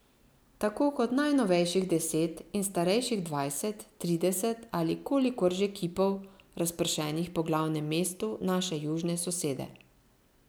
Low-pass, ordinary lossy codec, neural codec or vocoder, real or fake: none; none; none; real